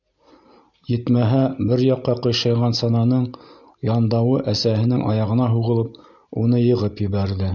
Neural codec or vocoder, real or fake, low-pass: none; real; 7.2 kHz